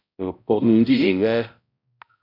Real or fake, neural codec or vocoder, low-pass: fake; codec, 16 kHz, 0.5 kbps, X-Codec, HuBERT features, trained on balanced general audio; 5.4 kHz